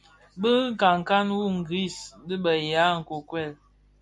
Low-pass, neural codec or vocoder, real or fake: 10.8 kHz; none; real